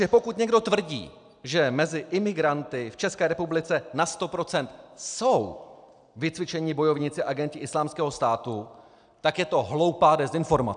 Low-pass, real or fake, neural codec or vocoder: 9.9 kHz; real; none